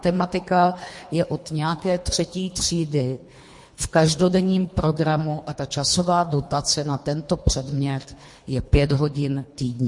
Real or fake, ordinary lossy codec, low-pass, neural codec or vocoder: fake; MP3, 48 kbps; 10.8 kHz; codec, 24 kHz, 3 kbps, HILCodec